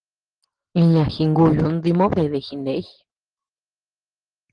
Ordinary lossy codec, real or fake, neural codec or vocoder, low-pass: Opus, 16 kbps; real; none; 9.9 kHz